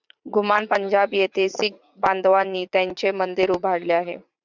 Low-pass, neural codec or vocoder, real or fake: 7.2 kHz; vocoder, 44.1 kHz, 80 mel bands, Vocos; fake